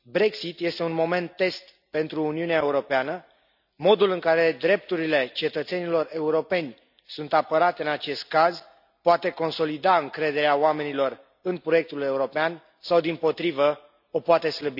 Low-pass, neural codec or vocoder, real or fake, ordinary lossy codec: 5.4 kHz; none; real; none